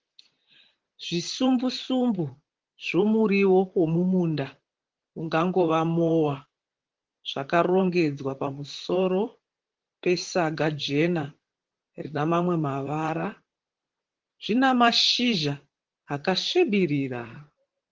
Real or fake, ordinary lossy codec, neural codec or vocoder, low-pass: fake; Opus, 16 kbps; vocoder, 44.1 kHz, 128 mel bands, Pupu-Vocoder; 7.2 kHz